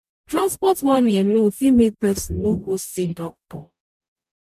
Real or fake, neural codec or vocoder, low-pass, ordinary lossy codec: fake; codec, 44.1 kHz, 0.9 kbps, DAC; 14.4 kHz; none